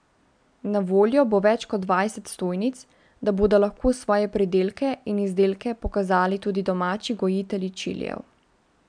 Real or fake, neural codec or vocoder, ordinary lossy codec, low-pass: real; none; MP3, 96 kbps; 9.9 kHz